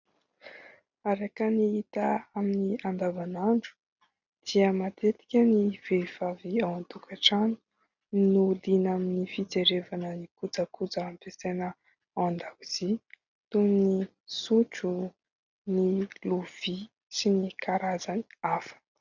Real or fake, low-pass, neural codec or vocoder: real; 7.2 kHz; none